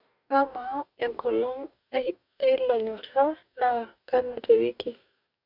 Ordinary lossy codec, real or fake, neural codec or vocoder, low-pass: none; fake; codec, 44.1 kHz, 2.6 kbps, DAC; 5.4 kHz